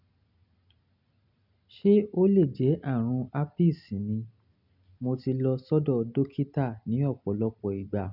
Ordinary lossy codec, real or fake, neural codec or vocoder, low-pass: none; real; none; 5.4 kHz